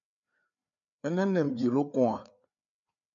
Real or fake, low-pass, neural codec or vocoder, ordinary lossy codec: fake; 7.2 kHz; codec, 16 kHz, 4 kbps, FreqCodec, larger model; MP3, 96 kbps